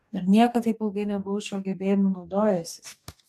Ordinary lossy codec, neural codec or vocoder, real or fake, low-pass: AAC, 96 kbps; codec, 44.1 kHz, 2.6 kbps, DAC; fake; 14.4 kHz